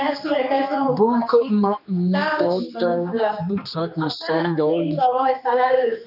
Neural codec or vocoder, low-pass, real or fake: codec, 16 kHz, 2 kbps, X-Codec, HuBERT features, trained on general audio; 5.4 kHz; fake